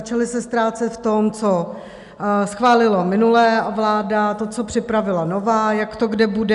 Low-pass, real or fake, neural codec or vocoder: 10.8 kHz; real; none